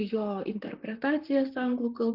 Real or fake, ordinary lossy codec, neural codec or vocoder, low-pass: fake; Opus, 24 kbps; vocoder, 44.1 kHz, 128 mel bands, Pupu-Vocoder; 5.4 kHz